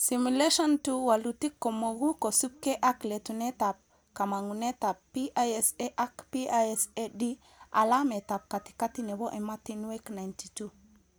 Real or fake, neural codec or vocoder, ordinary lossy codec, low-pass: real; none; none; none